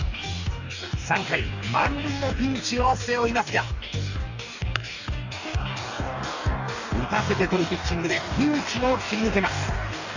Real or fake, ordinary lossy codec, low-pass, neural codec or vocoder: fake; none; 7.2 kHz; codec, 44.1 kHz, 2.6 kbps, DAC